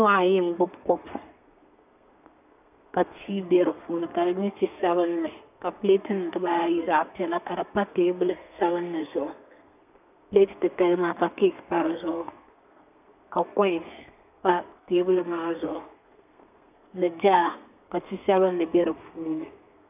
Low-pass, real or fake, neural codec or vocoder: 3.6 kHz; fake; codec, 32 kHz, 1.9 kbps, SNAC